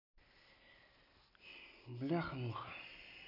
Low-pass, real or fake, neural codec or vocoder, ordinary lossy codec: 5.4 kHz; real; none; none